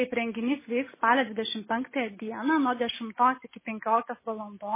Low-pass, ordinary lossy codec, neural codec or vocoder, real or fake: 3.6 kHz; MP3, 16 kbps; none; real